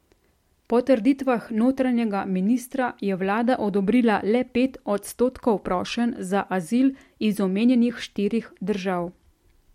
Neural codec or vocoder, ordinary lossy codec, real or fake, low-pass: none; MP3, 64 kbps; real; 19.8 kHz